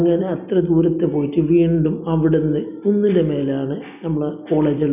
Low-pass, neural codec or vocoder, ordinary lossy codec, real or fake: 3.6 kHz; none; none; real